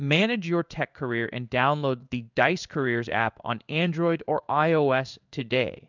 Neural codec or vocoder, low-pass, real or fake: codec, 16 kHz in and 24 kHz out, 1 kbps, XY-Tokenizer; 7.2 kHz; fake